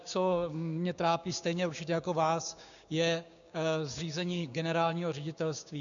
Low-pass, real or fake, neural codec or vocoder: 7.2 kHz; fake; codec, 16 kHz, 6 kbps, DAC